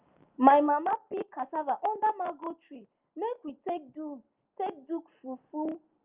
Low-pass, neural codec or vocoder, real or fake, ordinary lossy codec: 3.6 kHz; none; real; Opus, 24 kbps